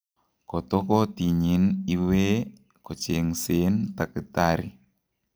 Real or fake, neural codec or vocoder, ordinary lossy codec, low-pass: real; none; none; none